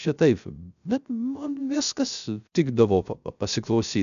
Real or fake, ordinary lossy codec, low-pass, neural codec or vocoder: fake; MP3, 96 kbps; 7.2 kHz; codec, 16 kHz, 0.3 kbps, FocalCodec